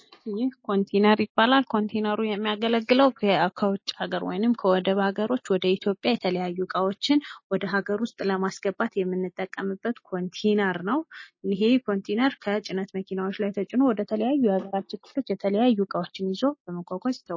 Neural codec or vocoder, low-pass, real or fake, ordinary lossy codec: none; 7.2 kHz; real; MP3, 32 kbps